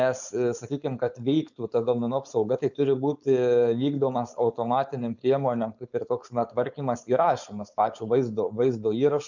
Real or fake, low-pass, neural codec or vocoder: fake; 7.2 kHz; codec, 16 kHz, 4.8 kbps, FACodec